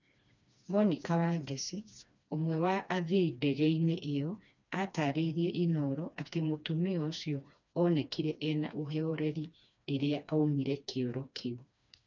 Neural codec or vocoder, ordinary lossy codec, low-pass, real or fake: codec, 16 kHz, 2 kbps, FreqCodec, smaller model; none; 7.2 kHz; fake